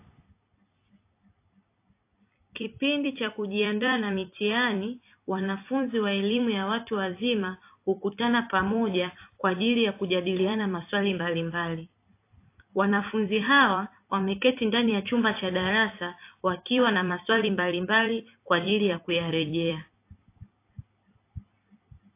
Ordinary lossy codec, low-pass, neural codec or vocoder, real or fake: AAC, 24 kbps; 3.6 kHz; vocoder, 44.1 kHz, 128 mel bands every 256 samples, BigVGAN v2; fake